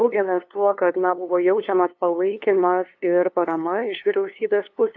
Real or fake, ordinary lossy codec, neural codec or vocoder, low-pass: fake; AAC, 48 kbps; codec, 16 kHz, 2 kbps, FunCodec, trained on LibriTTS, 25 frames a second; 7.2 kHz